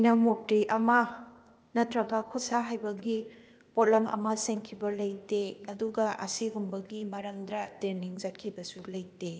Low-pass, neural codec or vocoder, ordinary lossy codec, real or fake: none; codec, 16 kHz, 0.8 kbps, ZipCodec; none; fake